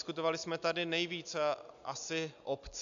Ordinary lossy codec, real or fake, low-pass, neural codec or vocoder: AAC, 96 kbps; real; 7.2 kHz; none